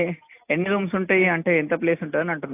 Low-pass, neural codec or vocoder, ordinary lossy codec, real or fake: 3.6 kHz; none; AAC, 24 kbps; real